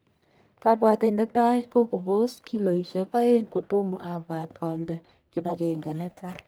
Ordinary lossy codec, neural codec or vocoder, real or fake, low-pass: none; codec, 44.1 kHz, 1.7 kbps, Pupu-Codec; fake; none